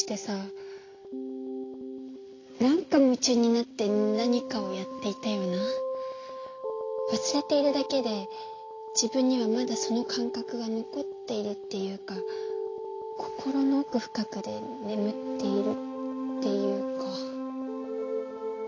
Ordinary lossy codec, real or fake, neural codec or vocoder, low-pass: AAC, 32 kbps; real; none; 7.2 kHz